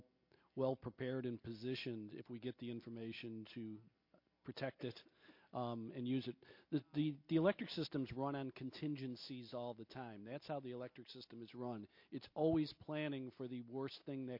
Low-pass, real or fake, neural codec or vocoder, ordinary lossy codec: 5.4 kHz; real; none; MP3, 32 kbps